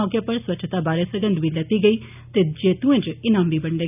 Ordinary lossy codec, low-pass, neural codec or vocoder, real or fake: none; 3.6 kHz; none; real